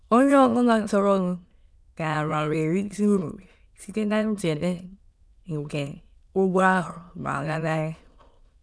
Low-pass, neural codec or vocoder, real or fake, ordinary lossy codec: none; autoencoder, 22.05 kHz, a latent of 192 numbers a frame, VITS, trained on many speakers; fake; none